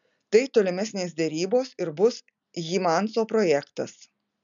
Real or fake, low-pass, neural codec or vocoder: real; 7.2 kHz; none